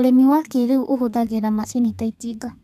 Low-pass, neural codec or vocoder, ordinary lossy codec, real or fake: 14.4 kHz; codec, 32 kHz, 1.9 kbps, SNAC; none; fake